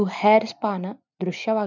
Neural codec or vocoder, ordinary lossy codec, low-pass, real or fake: none; none; 7.2 kHz; real